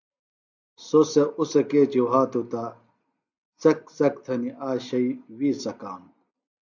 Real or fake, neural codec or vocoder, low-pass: real; none; 7.2 kHz